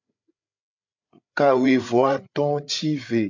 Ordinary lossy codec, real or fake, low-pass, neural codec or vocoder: MP3, 64 kbps; fake; 7.2 kHz; codec, 16 kHz, 8 kbps, FreqCodec, larger model